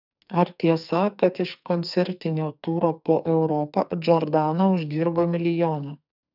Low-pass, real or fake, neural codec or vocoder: 5.4 kHz; fake; codec, 44.1 kHz, 2.6 kbps, SNAC